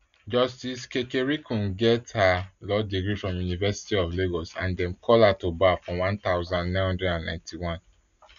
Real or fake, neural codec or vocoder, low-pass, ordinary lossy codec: real; none; 7.2 kHz; none